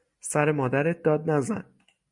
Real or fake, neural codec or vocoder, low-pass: real; none; 10.8 kHz